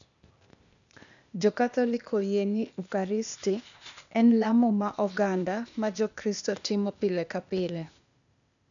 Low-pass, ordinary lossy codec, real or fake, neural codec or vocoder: 7.2 kHz; none; fake; codec, 16 kHz, 0.8 kbps, ZipCodec